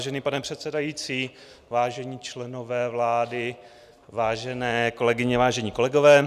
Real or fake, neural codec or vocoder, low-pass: real; none; 14.4 kHz